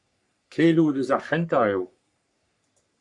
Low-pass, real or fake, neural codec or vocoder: 10.8 kHz; fake; codec, 44.1 kHz, 3.4 kbps, Pupu-Codec